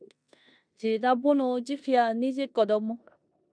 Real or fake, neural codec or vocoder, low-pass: fake; codec, 16 kHz in and 24 kHz out, 0.9 kbps, LongCat-Audio-Codec, four codebook decoder; 9.9 kHz